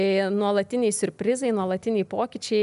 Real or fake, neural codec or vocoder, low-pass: real; none; 10.8 kHz